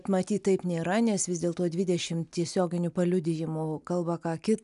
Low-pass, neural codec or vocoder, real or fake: 10.8 kHz; none; real